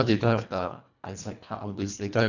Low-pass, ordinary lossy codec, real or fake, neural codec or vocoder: 7.2 kHz; none; fake; codec, 24 kHz, 1.5 kbps, HILCodec